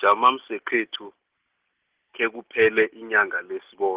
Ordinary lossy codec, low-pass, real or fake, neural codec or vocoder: Opus, 16 kbps; 3.6 kHz; real; none